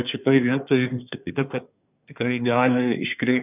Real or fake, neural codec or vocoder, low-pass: fake; codec, 24 kHz, 1 kbps, SNAC; 3.6 kHz